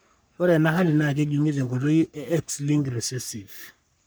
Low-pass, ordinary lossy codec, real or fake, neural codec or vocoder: none; none; fake; codec, 44.1 kHz, 3.4 kbps, Pupu-Codec